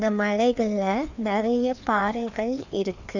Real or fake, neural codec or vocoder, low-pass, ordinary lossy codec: fake; codec, 16 kHz, 2 kbps, FreqCodec, larger model; 7.2 kHz; none